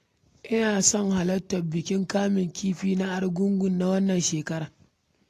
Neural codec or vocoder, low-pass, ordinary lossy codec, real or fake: none; 14.4 kHz; AAC, 48 kbps; real